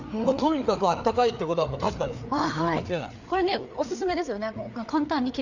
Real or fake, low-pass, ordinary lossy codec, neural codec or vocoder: fake; 7.2 kHz; none; codec, 16 kHz, 4 kbps, FreqCodec, larger model